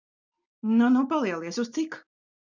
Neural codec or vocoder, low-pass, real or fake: none; 7.2 kHz; real